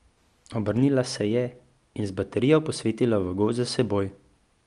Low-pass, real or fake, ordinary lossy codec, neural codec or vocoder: 10.8 kHz; real; Opus, 32 kbps; none